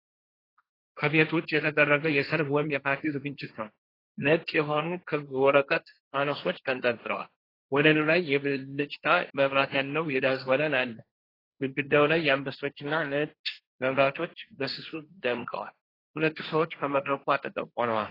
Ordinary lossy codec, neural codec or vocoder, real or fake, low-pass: AAC, 24 kbps; codec, 16 kHz, 1.1 kbps, Voila-Tokenizer; fake; 5.4 kHz